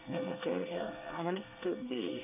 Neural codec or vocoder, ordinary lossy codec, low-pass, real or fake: codec, 24 kHz, 1 kbps, SNAC; none; 3.6 kHz; fake